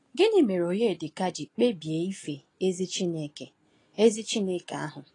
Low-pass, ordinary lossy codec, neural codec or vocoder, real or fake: 10.8 kHz; AAC, 32 kbps; none; real